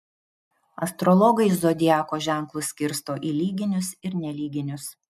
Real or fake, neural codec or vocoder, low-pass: real; none; 14.4 kHz